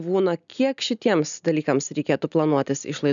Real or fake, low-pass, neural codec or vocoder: real; 7.2 kHz; none